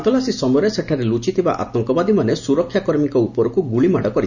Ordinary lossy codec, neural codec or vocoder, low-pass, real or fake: none; none; 7.2 kHz; real